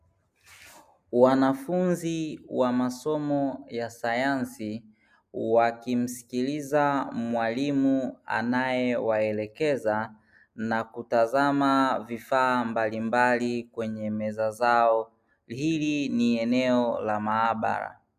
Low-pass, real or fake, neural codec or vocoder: 14.4 kHz; real; none